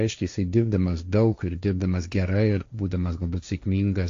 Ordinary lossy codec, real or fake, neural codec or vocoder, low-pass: AAC, 48 kbps; fake; codec, 16 kHz, 1.1 kbps, Voila-Tokenizer; 7.2 kHz